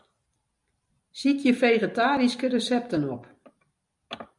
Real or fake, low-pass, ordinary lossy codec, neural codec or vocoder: real; 10.8 kHz; MP3, 96 kbps; none